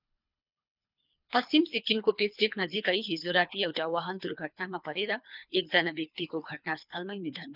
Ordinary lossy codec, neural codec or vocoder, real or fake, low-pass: none; codec, 24 kHz, 3 kbps, HILCodec; fake; 5.4 kHz